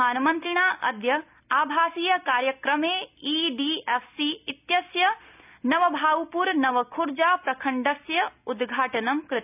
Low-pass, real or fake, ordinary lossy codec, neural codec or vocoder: 3.6 kHz; real; none; none